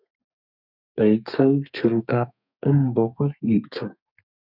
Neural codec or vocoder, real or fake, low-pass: codec, 32 kHz, 1.9 kbps, SNAC; fake; 5.4 kHz